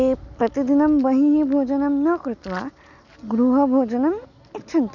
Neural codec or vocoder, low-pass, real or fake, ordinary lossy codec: none; 7.2 kHz; real; none